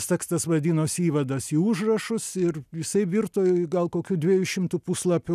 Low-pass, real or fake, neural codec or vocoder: 14.4 kHz; real; none